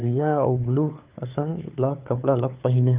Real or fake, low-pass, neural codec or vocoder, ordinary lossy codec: fake; 3.6 kHz; codec, 16 kHz, 4 kbps, FunCodec, trained on LibriTTS, 50 frames a second; Opus, 32 kbps